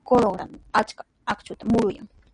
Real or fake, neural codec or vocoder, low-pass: real; none; 9.9 kHz